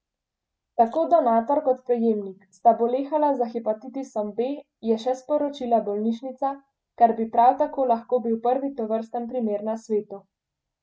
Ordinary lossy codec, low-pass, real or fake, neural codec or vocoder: none; none; real; none